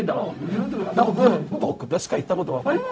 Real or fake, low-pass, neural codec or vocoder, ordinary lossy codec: fake; none; codec, 16 kHz, 0.4 kbps, LongCat-Audio-Codec; none